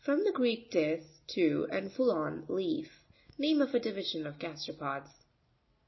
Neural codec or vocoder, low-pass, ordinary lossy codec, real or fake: none; 7.2 kHz; MP3, 24 kbps; real